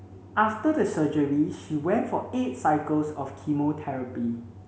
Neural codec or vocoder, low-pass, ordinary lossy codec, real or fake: none; none; none; real